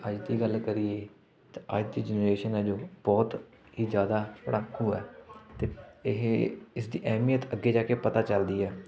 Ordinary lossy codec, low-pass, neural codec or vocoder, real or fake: none; none; none; real